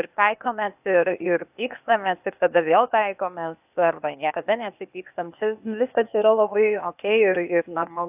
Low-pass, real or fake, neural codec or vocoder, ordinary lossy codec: 3.6 kHz; fake; codec, 16 kHz, 0.8 kbps, ZipCodec; AAC, 32 kbps